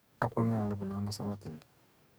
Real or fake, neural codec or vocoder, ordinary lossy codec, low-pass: fake; codec, 44.1 kHz, 2.6 kbps, DAC; none; none